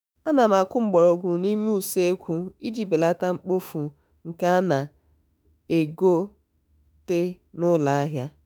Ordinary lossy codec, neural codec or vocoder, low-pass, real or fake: none; autoencoder, 48 kHz, 32 numbers a frame, DAC-VAE, trained on Japanese speech; none; fake